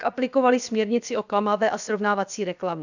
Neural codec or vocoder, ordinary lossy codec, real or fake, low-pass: codec, 16 kHz, about 1 kbps, DyCAST, with the encoder's durations; none; fake; 7.2 kHz